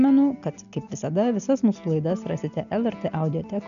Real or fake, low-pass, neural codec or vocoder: real; 7.2 kHz; none